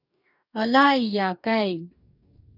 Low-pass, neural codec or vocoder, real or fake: 5.4 kHz; codec, 44.1 kHz, 2.6 kbps, DAC; fake